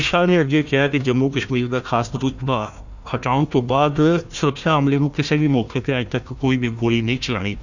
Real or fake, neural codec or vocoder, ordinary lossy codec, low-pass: fake; codec, 16 kHz, 1 kbps, FunCodec, trained on Chinese and English, 50 frames a second; none; 7.2 kHz